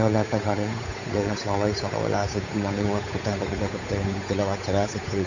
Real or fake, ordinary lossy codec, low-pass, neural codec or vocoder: fake; none; 7.2 kHz; codec, 16 kHz, 8 kbps, FunCodec, trained on Chinese and English, 25 frames a second